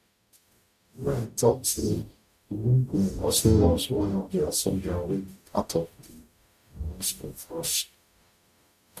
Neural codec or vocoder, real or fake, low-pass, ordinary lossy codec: codec, 44.1 kHz, 0.9 kbps, DAC; fake; 14.4 kHz; none